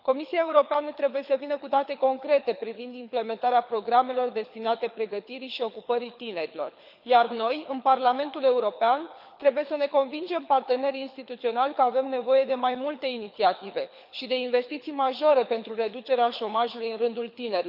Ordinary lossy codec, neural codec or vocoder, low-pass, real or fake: none; codec, 24 kHz, 6 kbps, HILCodec; 5.4 kHz; fake